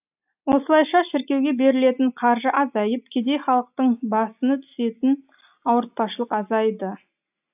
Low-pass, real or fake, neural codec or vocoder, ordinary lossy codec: 3.6 kHz; real; none; AAC, 32 kbps